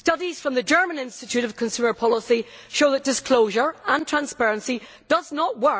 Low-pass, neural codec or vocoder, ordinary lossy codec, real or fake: none; none; none; real